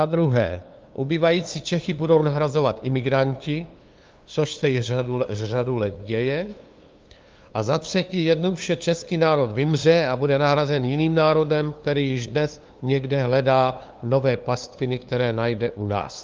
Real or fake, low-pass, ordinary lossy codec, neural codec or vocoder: fake; 7.2 kHz; Opus, 24 kbps; codec, 16 kHz, 2 kbps, FunCodec, trained on LibriTTS, 25 frames a second